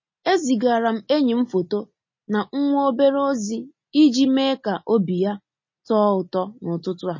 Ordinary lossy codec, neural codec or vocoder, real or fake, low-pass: MP3, 32 kbps; none; real; 7.2 kHz